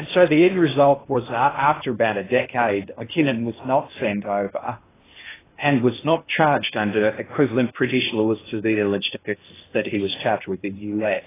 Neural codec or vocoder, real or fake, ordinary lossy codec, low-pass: codec, 16 kHz in and 24 kHz out, 0.6 kbps, FocalCodec, streaming, 2048 codes; fake; AAC, 16 kbps; 3.6 kHz